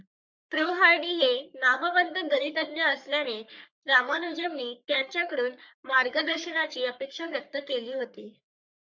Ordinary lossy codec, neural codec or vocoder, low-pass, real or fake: MP3, 64 kbps; codec, 44.1 kHz, 3.4 kbps, Pupu-Codec; 7.2 kHz; fake